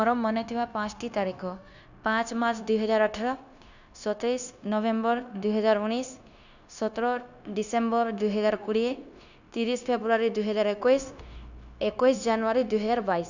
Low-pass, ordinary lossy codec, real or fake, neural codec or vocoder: 7.2 kHz; none; fake; codec, 16 kHz, 0.9 kbps, LongCat-Audio-Codec